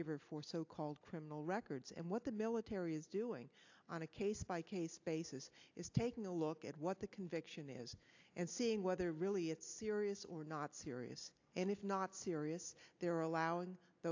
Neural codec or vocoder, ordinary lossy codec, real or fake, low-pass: none; AAC, 48 kbps; real; 7.2 kHz